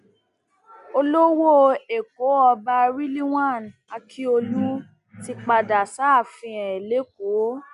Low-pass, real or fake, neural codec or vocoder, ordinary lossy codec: 10.8 kHz; real; none; none